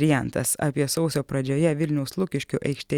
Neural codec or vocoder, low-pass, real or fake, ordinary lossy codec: none; 19.8 kHz; real; Opus, 64 kbps